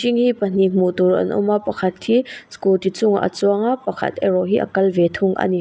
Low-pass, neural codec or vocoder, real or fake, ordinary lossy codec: none; none; real; none